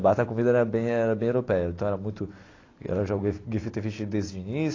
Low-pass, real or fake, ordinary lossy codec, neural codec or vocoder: 7.2 kHz; real; AAC, 32 kbps; none